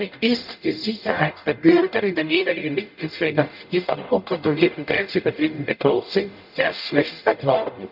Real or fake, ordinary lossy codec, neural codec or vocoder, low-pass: fake; none; codec, 44.1 kHz, 0.9 kbps, DAC; 5.4 kHz